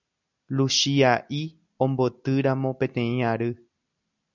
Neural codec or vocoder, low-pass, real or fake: none; 7.2 kHz; real